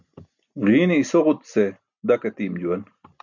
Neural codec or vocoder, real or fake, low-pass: none; real; 7.2 kHz